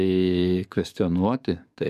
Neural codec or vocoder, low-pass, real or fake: codec, 44.1 kHz, 7.8 kbps, DAC; 14.4 kHz; fake